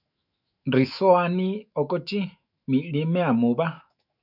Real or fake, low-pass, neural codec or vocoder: fake; 5.4 kHz; autoencoder, 48 kHz, 128 numbers a frame, DAC-VAE, trained on Japanese speech